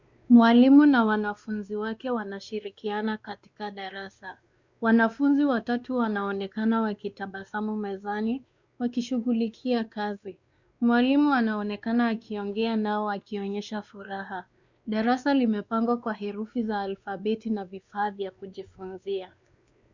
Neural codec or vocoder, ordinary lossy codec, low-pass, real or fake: codec, 16 kHz, 2 kbps, X-Codec, WavLM features, trained on Multilingual LibriSpeech; Opus, 64 kbps; 7.2 kHz; fake